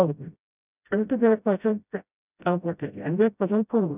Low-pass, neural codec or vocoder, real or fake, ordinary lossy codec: 3.6 kHz; codec, 16 kHz, 0.5 kbps, FreqCodec, smaller model; fake; none